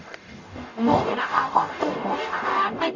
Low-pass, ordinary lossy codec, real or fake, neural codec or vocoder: 7.2 kHz; none; fake; codec, 44.1 kHz, 0.9 kbps, DAC